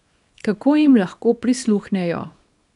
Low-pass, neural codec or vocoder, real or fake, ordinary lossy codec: 10.8 kHz; codec, 24 kHz, 0.9 kbps, WavTokenizer, small release; fake; none